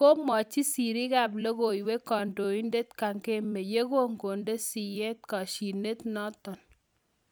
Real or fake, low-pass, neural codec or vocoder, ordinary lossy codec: fake; none; vocoder, 44.1 kHz, 128 mel bands every 256 samples, BigVGAN v2; none